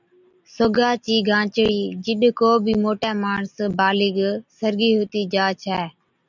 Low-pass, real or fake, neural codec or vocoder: 7.2 kHz; real; none